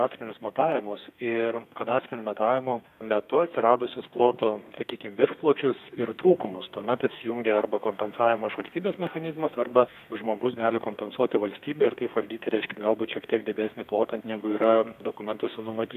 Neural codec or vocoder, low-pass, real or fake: codec, 32 kHz, 1.9 kbps, SNAC; 14.4 kHz; fake